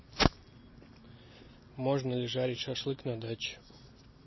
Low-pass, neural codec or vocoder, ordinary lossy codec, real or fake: 7.2 kHz; none; MP3, 24 kbps; real